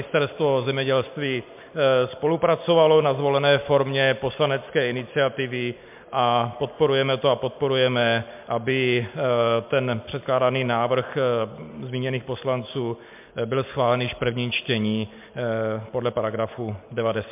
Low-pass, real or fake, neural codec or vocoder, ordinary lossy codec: 3.6 kHz; real; none; MP3, 32 kbps